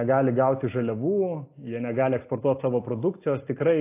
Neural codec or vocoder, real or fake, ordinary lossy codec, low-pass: none; real; AAC, 24 kbps; 3.6 kHz